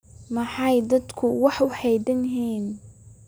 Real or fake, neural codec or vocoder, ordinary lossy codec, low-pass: real; none; none; none